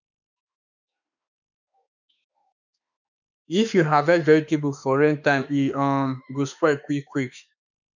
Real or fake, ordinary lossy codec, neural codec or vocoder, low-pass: fake; none; autoencoder, 48 kHz, 32 numbers a frame, DAC-VAE, trained on Japanese speech; 7.2 kHz